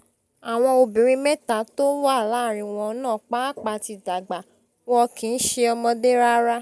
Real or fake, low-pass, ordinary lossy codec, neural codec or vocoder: real; none; none; none